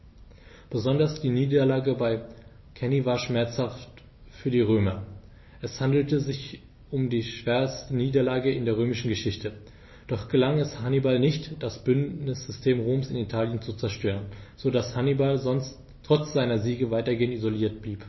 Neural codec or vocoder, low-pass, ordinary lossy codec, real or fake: none; 7.2 kHz; MP3, 24 kbps; real